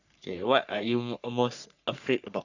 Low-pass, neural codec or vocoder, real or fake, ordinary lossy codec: 7.2 kHz; codec, 44.1 kHz, 3.4 kbps, Pupu-Codec; fake; none